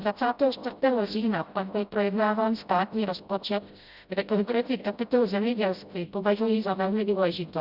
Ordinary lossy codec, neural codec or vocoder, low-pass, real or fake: Opus, 64 kbps; codec, 16 kHz, 0.5 kbps, FreqCodec, smaller model; 5.4 kHz; fake